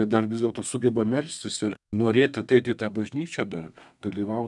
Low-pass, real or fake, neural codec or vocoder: 10.8 kHz; fake; codec, 32 kHz, 1.9 kbps, SNAC